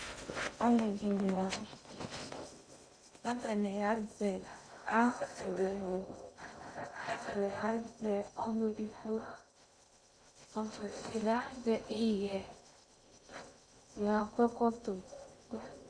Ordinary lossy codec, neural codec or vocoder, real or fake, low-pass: Opus, 64 kbps; codec, 16 kHz in and 24 kHz out, 0.6 kbps, FocalCodec, streaming, 2048 codes; fake; 9.9 kHz